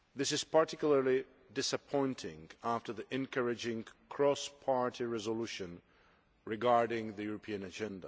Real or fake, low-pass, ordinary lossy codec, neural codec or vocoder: real; none; none; none